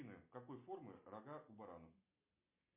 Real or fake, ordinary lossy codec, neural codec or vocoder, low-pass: real; MP3, 32 kbps; none; 3.6 kHz